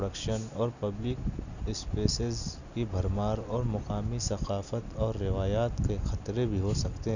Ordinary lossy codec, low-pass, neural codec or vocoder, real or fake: none; 7.2 kHz; none; real